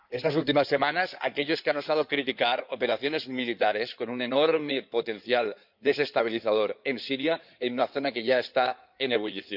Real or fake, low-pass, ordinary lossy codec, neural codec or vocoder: fake; 5.4 kHz; none; codec, 16 kHz in and 24 kHz out, 2.2 kbps, FireRedTTS-2 codec